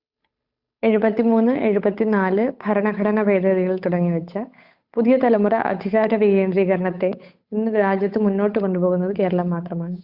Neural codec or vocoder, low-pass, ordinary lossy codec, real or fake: codec, 16 kHz, 8 kbps, FunCodec, trained on Chinese and English, 25 frames a second; 5.4 kHz; Opus, 64 kbps; fake